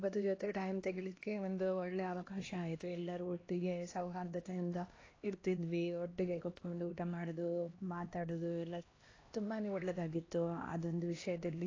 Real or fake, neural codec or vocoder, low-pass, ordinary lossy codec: fake; codec, 16 kHz, 1 kbps, X-Codec, HuBERT features, trained on LibriSpeech; 7.2 kHz; AAC, 32 kbps